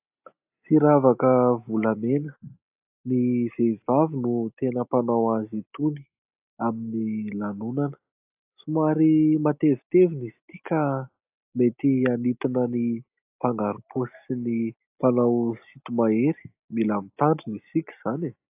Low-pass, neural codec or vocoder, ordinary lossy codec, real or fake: 3.6 kHz; none; AAC, 32 kbps; real